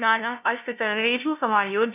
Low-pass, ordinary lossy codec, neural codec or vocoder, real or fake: 3.6 kHz; none; codec, 16 kHz, 0.5 kbps, FunCodec, trained on LibriTTS, 25 frames a second; fake